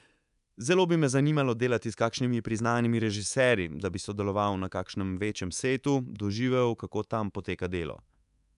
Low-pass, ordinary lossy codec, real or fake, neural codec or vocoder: 10.8 kHz; none; fake; codec, 24 kHz, 3.1 kbps, DualCodec